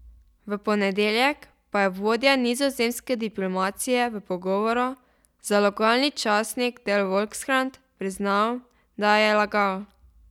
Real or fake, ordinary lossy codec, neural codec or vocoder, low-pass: real; none; none; 19.8 kHz